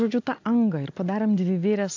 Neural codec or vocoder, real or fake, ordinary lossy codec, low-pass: none; real; AAC, 48 kbps; 7.2 kHz